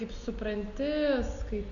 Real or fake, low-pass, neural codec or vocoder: real; 7.2 kHz; none